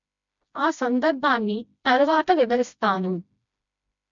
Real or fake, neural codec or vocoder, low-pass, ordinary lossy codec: fake; codec, 16 kHz, 1 kbps, FreqCodec, smaller model; 7.2 kHz; none